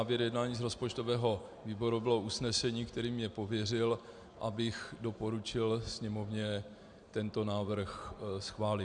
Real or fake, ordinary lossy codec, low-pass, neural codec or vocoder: real; MP3, 96 kbps; 9.9 kHz; none